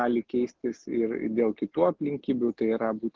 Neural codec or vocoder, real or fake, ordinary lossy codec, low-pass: none; real; Opus, 16 kbps; 7.2 kHz